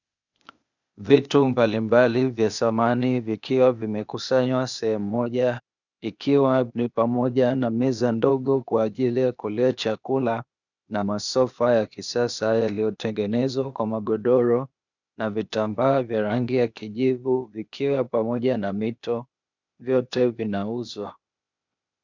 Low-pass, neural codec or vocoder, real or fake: 7.2 kHz; codec, 16 kHz, 0.8 kbps, ZipCodec; fake